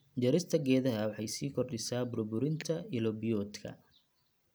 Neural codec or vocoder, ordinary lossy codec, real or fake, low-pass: none; none; real; none